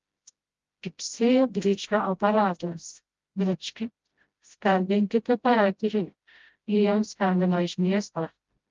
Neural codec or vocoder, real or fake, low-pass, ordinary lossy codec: codec, 16 kHz, 0.5 kbps, FreqCodec, smaller model; fake; 7.2 kHz; Opus, 32 kbps